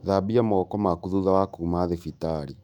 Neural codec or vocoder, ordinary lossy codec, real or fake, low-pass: autoencoder, 48 kHz, 128 numbers a frame, DAC-VAE, trained on Japanese speech; none; fake; 19.8 kHz